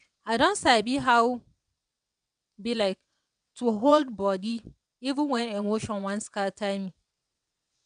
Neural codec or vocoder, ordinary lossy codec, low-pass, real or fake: vocoder, 22.05 kHz, 80 mel bands, WaveNeXt; none; 9.9 kHz; fake